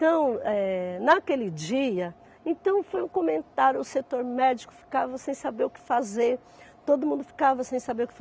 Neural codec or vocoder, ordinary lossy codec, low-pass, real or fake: none; none; none; real